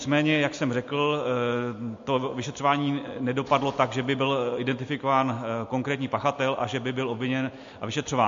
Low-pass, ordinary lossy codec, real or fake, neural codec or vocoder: 7.2 kHz; MP3, 48 kbps; real; none